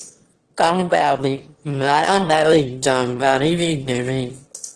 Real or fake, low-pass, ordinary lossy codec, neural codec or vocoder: fake; 9.9 kHz; Opus, 16 kbps; autoencoder, 22.05 kHz, a latent of 192 numbers a frame, VITS, trained on one speaker